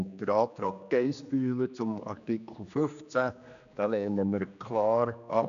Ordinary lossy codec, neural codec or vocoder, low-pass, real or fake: none; codec, 16 kHz, 2 kbps, X-Codec, HuBERT features, trained on general audio; 7.2 kHz; fake